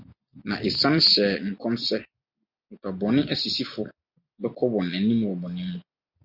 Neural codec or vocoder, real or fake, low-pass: none; real; 5.4 kHz